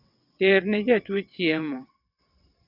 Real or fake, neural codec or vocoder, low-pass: fake; vocoder, 22.05 kHz, 80 mel bands, WaveNeXt; 5.4 kHz